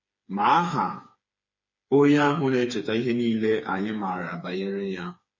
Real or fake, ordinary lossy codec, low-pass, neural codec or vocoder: fake; MP3, 32 kbps; 7.2 kHz; codec, 16 kHz, 4 kbps, FreqCodec, smaller model